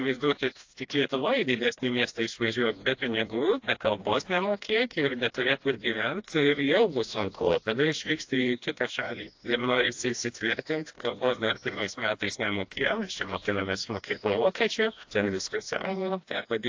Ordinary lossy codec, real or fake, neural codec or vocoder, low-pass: AAC, 48 kbps; fake; codec, 16 kHz, 1 kbps, FreqCodec, smaller model; 7.2 kHz